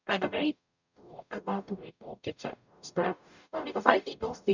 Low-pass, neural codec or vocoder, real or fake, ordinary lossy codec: 7.2 kHz; codec, 44.1 kHz, 0.9 kbps, DAC; fake; none